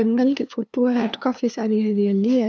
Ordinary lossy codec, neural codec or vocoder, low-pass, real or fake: none; codec, 16 kHz, 2 kbps, FunCodec, trained on LibriTTS, 25 frames a second; none; fake